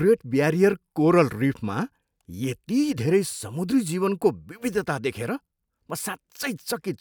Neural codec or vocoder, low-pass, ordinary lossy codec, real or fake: none; none; none; real